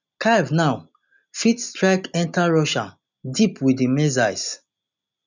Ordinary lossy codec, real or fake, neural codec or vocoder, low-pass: none; real; none; 7.2 kHz